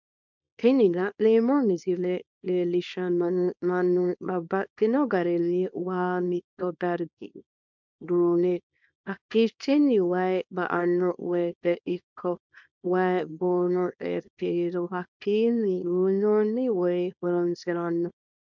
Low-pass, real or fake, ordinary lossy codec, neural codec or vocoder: 7.2 kHz; fake; MP3, 64 kbps; codec, 24 kHz, 0.9 kbps, WavTokenizer, small release